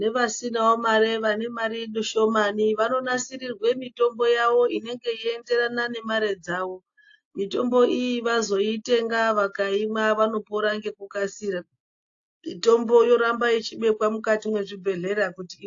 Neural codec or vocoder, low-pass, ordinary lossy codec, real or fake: none; 7.2 kHz; AAC, 48 kbps; real